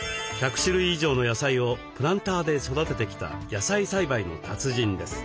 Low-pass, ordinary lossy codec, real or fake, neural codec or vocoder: none; none; real; none